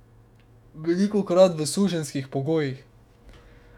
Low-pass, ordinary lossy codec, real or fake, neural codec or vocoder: 19.8 kHz; none; fake; autoencoder, 48 kHz, 128 numbers a frame, DAC-VAE, trained on Japanese speech